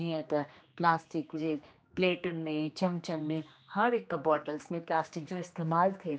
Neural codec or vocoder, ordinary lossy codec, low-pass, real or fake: codec, 16 kHz, 2 kbps, X-Codec, HuBERT features, trained on general audio; none; none; fake